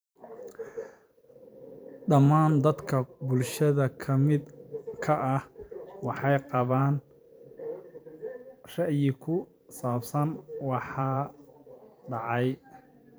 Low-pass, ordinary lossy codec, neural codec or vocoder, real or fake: none; none; vocoder, 44.1 kHz, 128 mel bands every 512 samples, BigVGAN v2; fake